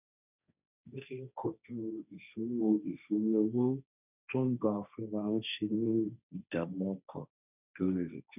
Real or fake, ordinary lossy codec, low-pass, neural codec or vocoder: fake; none; 3.6 kHz; codec, 16 kHz, 1.1 kbps, Voila-Tokenizer